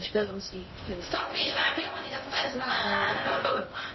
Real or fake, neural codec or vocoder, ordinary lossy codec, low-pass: fake; codec, 16 kHz in and 24 kHz out, 0.6 kbps, FocalCodec, streaming, 2048 codes; MP3, 24 kbps; 7.2 kHz